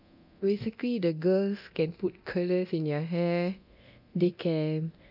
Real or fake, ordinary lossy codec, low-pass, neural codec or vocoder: fake; none; 5.4 kHz; codec, 24 kHz, 0.9 kbps, DualCodec